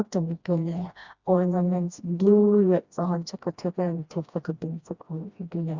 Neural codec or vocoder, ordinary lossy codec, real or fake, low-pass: codec, 16 kHz, 1 kbps, FreqCodec, smaller model; Opus, 64 kbps; fake; 7.2 kHz